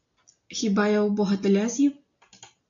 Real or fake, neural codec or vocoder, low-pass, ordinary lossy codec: real; none; 7.2 kHz; MP3, 64 kbps